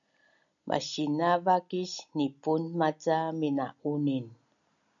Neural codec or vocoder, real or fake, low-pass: none; real; 7.2 kHz